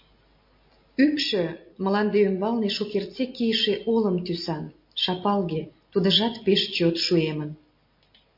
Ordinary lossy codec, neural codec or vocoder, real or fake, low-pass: MP3, 32 kbps; none; real; 5.4 kHz